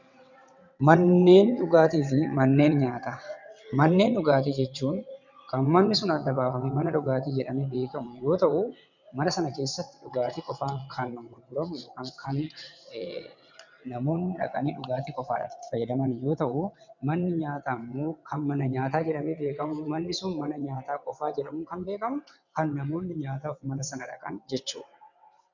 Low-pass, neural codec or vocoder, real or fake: 7.2 kHz; vocoder, 22.05 kHz, 80 mel bands, WaveNeXt; fake